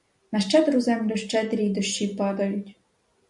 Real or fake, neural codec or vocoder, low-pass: real; none; 10.8 kHz